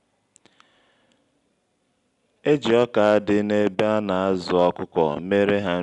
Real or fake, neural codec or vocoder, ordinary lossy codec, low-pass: real; none; none; 10.8 kHz